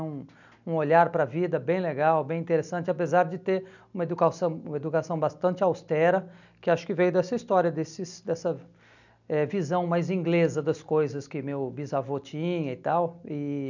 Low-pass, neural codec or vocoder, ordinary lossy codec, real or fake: 7.2 kHz; none; none; real